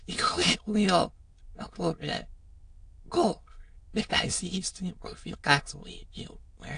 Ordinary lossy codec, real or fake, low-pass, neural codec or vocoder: AAC, 48 kbps; fake; 9.9 kHz; autoencoder, 22.05 kHz, a latent of 192 numbers a frame, VITS, trained on many speakers